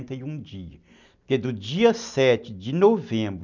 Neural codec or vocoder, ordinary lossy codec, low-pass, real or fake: none; none; 7.2 kHz; real